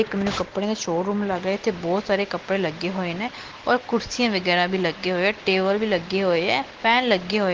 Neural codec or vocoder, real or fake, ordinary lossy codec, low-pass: none; real; Opus, 16 kbps; 7.2 kHz